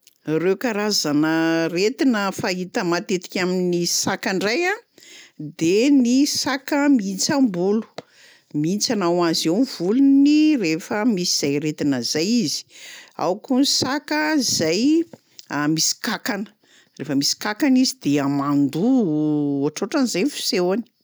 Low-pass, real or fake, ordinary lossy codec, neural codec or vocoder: none; real; none; none